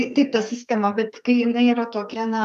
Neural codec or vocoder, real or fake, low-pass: codec, 32 kHz, 1.9 kbps, SNAC; fake; 14.4 kHz